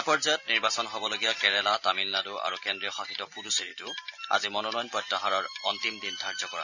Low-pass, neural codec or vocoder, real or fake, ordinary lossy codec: 7.2 kHz; none; real; none